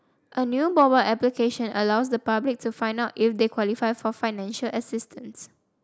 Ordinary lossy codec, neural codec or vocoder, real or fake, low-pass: none; none; real; none